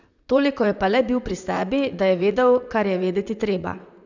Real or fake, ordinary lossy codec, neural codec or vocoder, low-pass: fake; none; vocoder, 44.1 kHz, 128 mel bands, Pupu-Vocoder; 7.2 kHz